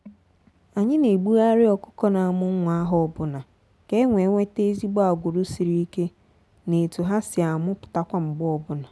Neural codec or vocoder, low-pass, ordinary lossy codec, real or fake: none; none; none; real